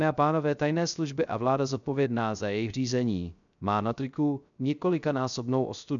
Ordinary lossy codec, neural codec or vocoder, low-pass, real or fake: MP3, 96 kbps; codec, 16 kHz, 0.3 kbps, FocalCodec; 7.2 kHz; fake